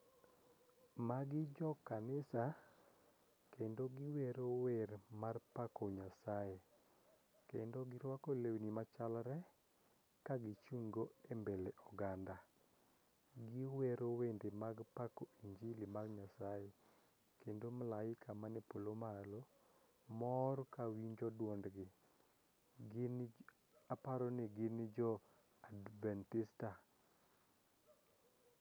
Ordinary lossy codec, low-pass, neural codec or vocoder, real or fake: none; none; none; real